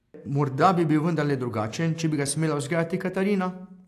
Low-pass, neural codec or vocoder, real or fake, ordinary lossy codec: 14.4 kHz; none; real; AAC, 64 kbps